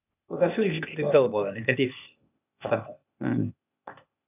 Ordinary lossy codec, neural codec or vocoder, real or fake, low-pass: none; codec, 16 kHz, 0.8 kbps, ZipCodec; fake; 3.6 kHz